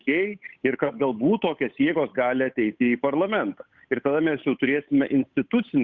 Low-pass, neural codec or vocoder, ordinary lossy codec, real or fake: 7.2 kHz; codec, 16 kHz, 8 kbps, FunCodec, trained on Chinese and English, 25 frames a second; Opus, 64 kbps; fake